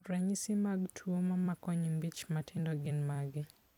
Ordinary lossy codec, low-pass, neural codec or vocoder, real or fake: none; 19.8 kHz; vocoder, 44.1 kHz, 128 mel bands every 256 samples, BigVGAN v2; fake